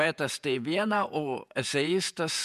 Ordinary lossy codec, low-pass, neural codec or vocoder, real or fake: MP3, 96 kbps; 14.4 kHz; vocoder, 48 kHz, 128 mel bands, Vocos; fake